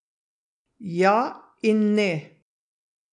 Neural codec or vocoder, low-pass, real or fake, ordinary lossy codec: none; 10.8 kHz; real; none